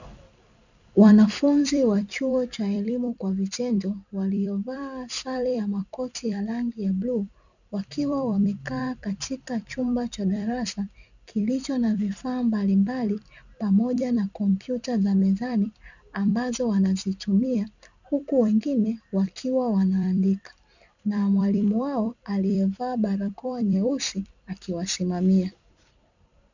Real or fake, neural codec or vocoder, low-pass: fake; vocoder, 44.1 kHz, 128 mel bands every 256 samples, BigVGAN v2; 7.2 kHz